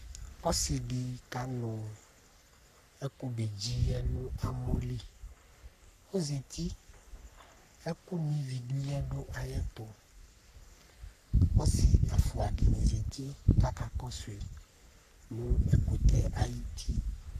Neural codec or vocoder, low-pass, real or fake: codec, 44.1 kHz, 3.4 kbps, Pupu-Codec; 14.4 kHz; fake